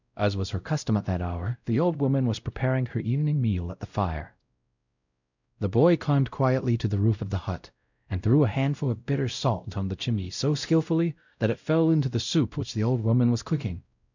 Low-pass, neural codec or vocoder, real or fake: 7.2 kHz; codec, 16 kHz, 0.5 kbps, X-Codec, WavLM features, trained on Multilingual LibriSpeech; fake